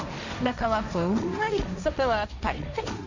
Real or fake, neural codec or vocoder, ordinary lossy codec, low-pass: fake; codec, 16 kHz, 1.1 kbps, Voila-Tokenizer; none; 7.2 kHz